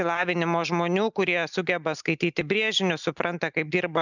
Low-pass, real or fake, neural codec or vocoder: 7.2 kHz; real; none